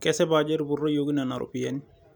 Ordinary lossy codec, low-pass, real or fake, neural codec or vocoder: none; none; real; none